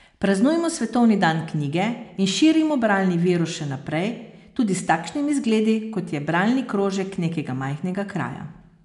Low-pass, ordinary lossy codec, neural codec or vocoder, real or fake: 10.8 kHz; none; none; real